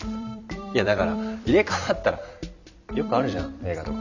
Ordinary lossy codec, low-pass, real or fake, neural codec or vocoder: none; 7.2 kHz; fake; vocoder, 44.1 kHz, 128 mel bands every 256 samples, BigVGAN v2